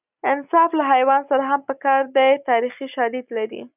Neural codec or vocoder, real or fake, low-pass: none; real; 3.6 kHz